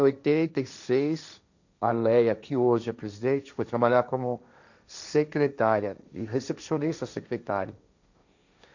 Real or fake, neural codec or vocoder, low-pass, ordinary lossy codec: fake; codec, 16 kHz, 1.1 kbps, Voila-Tokenizer; 7.2 kHz; none